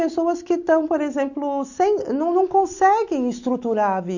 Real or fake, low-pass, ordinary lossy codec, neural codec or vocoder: real; 7.2 kHz; none; none